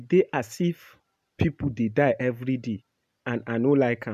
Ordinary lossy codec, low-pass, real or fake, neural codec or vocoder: AAC, 96 kbps; 14.4 kHz; real; none